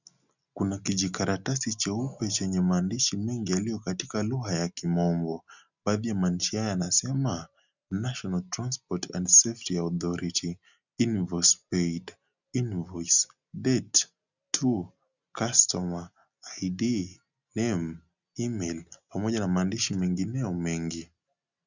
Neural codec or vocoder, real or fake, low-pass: none; real; 7.2 kHz